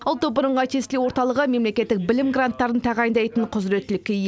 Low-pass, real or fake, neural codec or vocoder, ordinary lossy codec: none; real; none; none